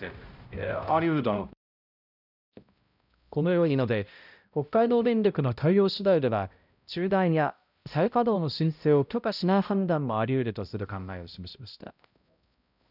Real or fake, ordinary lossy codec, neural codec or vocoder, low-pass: fake; none; codec, 16 kHz, 0.5 kbps, X-Codec, HuBERT features, trained on balanced general audio; 5.4 kHz